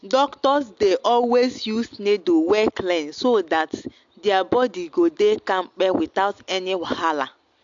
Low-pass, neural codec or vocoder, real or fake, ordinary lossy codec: 7.2 kHz; none; real; AAC, 64 kbps